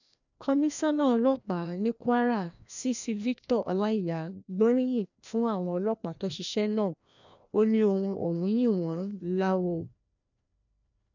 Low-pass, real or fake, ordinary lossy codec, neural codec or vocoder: 7.2 kHz; fake; none; codec, 16 kHz, 1 kbps, FreqCodec, larger model